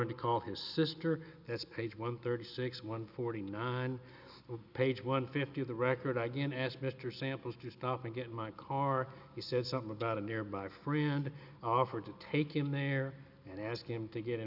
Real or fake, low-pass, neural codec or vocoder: real; 5.4 kHz; none